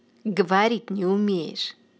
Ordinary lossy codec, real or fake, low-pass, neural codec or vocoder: none; real; none; none